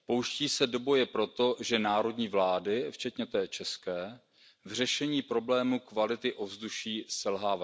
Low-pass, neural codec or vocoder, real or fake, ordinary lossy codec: none; none; real; none